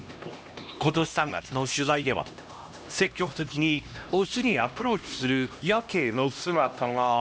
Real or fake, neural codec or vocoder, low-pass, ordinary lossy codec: fake; codec, 16 kHz, 1 kbps, X-Codec, HuBERT features, trained on LibriSpeech; none; none